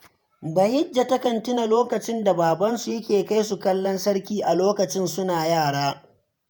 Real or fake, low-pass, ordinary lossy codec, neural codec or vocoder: fake; none; none; vocoder, 48 kHz, 128 mel bands, Vocos